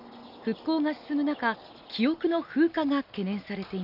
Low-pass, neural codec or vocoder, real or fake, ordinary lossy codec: 5.4 kHz; none; real; none